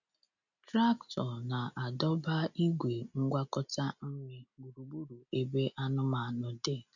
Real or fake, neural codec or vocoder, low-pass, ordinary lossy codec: real; none; 7.2 kHz; none